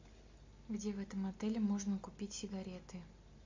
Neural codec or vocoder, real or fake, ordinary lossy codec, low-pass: none; real; MP3, 48 kbps; 7.2 kHz